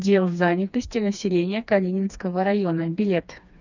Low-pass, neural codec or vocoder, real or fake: 7.2 kHz; codec, 16 kHz, 2 kbps, FreqCodec, smaller model; fake